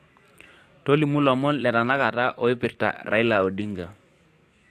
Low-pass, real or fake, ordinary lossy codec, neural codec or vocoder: 14.4 kHz; fake; AAC, 64 kbps; codec, 44.1 kHz, 7.8 kbps, DAC